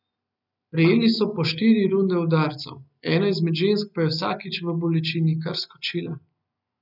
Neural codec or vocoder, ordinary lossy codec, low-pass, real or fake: none; none; 5.4 kHz; real